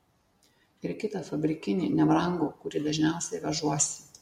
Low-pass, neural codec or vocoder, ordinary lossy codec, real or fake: 19.8 kHz; none; MP3, 64 kbps; real